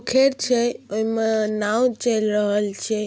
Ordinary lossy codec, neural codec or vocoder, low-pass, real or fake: none; none; none; real